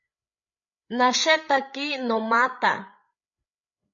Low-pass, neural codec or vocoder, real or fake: 7.2 kHz; codec, 16 kHz, 8 kbps, FreqCodec, larger model; fake